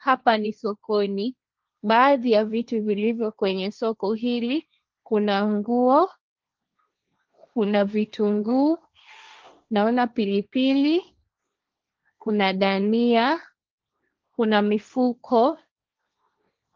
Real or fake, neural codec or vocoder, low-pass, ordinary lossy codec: fake; codec, 16 kHz, 1.1 kbps, Voila-Tokenizer; 7.2 kHz; Opus, 24 kbps